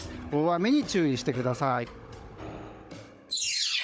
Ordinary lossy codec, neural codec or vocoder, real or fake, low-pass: none; codec, 16 kHz, 16 kbps, FunCodec, trained on Chinese and English, 50 frames a second; fake; none